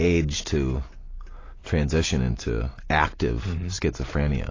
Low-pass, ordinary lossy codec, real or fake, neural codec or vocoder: 7.2 kHz; AAC, 32 kbps; real; none